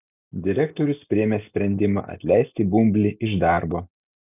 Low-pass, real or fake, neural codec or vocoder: 3.6 kHz; real; none